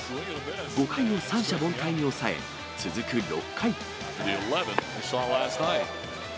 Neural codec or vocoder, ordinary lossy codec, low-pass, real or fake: none; none; none; real